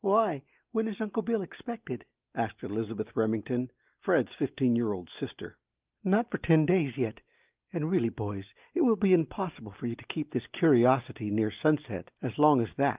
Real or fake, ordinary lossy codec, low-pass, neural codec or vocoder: real; Opus, 32 kbps; 3.6 kHz; none